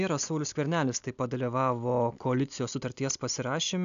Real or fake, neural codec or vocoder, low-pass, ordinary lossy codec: real; none; 7.2 kHz; MP3, 96 kbps